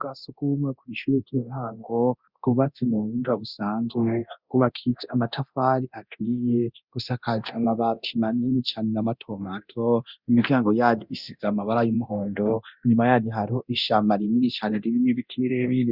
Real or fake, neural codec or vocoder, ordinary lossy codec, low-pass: fake; codec, 24 kHz, 0.9 kbps, DualCodec; Opus, 64 kbps; 5.4 kHz